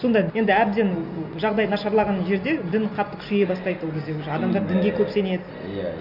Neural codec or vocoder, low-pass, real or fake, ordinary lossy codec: none; 5.4 kHz; real; none